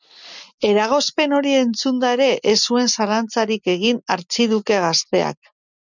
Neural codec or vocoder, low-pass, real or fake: none; 7.2 kHz; real